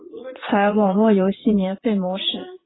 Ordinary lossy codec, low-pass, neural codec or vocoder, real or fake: AAC, 16 kbps; 7.2 kHz; vocoder, 22.05 kHz, 80 mel bands, Vocos; fake